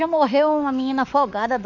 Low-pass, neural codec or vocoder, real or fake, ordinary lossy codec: 7.2 kHz; autoencoder, 48 kHz, 32 numbers a frame, DAC-VAE, trained on Japanese speech; fake; none